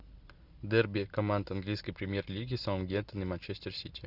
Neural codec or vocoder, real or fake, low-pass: none; real; 5.4 kHz